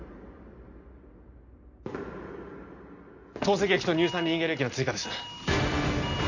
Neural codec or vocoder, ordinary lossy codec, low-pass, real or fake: none; none; 7.2 kHz; real